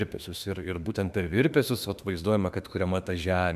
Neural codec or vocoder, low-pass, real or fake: autoencoder, 48 kHz, 32 numbers a frame, DAC-VAE, trained on Japanese speech; 14.4 kHz; fake